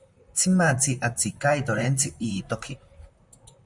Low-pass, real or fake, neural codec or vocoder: 10.8 kHz; fake; vocoder, 44.1 kHz, 128 mel bands, Pupu-Vocoder